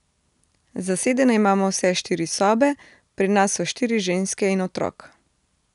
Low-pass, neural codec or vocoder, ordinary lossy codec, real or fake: 10.8 kHz; none; none; real